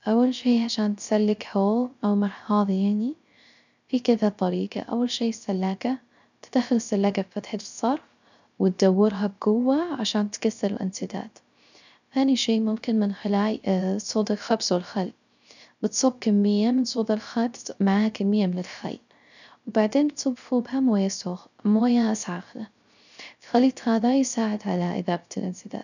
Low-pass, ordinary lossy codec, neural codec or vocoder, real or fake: 7.2 kHz; none; codec, 16 kHz, 0.3 kbps, FocalCodec; fake